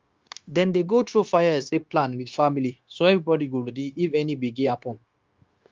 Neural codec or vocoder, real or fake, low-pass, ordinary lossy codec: codec, 16 kHz, 0.9 kbps, LongCat-Audio-Codec; fake; 7.2 kHz; Opus, 32 kbps